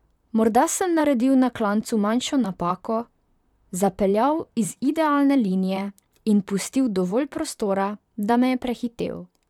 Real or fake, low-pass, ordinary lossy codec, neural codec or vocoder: fake; 19.8 kHz; none; vocoder, 44.1 kHz, 128 mel bands, Pupu-Vocoder